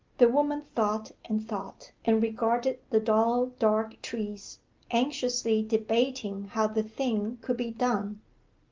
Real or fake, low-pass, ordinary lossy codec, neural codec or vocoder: real; 7.2 kHz; Opus, 24 kbps; none